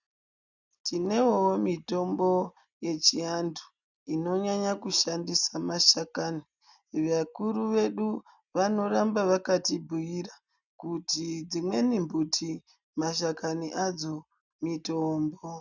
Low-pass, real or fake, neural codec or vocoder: 7.2 kHz; real; none